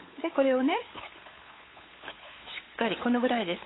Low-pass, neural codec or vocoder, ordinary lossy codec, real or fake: 7.2 kHz; codec, 16 kHz, 8 kbps, FunCodec, trained on LibriTTS, 25 frames a second; AAC, 16 kbps; fake